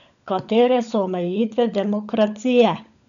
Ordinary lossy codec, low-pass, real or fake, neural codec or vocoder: none; 7.2 kHz; fake; codec, 16 kHz, 16 kbps, FunCodec, trained on LibriTTS, 50 frames a second